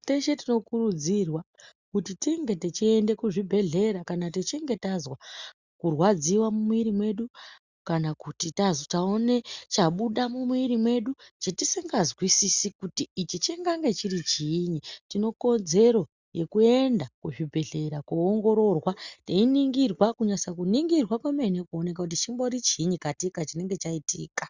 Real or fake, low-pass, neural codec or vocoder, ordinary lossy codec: real; 7.2 kHz; none; Opus, 64 kbps